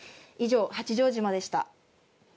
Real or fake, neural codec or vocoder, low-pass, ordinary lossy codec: real; none; none; none